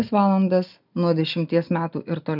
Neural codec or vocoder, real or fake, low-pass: none; real; 5.4 kHz